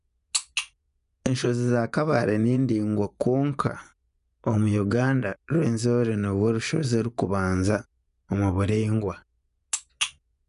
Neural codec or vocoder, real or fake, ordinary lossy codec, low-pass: vocoder, 24 kHz, 100 mel bands, Vocos; fake; none; 10.8 kHz